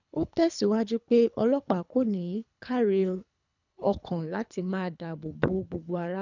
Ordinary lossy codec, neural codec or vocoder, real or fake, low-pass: none; codec, 24 kHz, 3 kbps, HILCodec; fake; 7.2 kHz